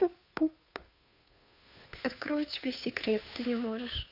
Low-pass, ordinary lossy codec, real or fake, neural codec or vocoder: 5.4 kHz; none; fake; codec, 16 kHz, 2 kbps, FunCodec, trained on Chinese and English, 25 frames a second